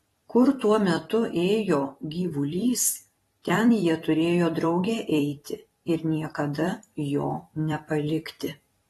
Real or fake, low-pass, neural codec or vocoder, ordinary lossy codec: fake; 19.8 kHz; vocoder, 44.1 kHz, 128 mel bands every 256 samples, BigVGAN v2; AAC, 32 kbps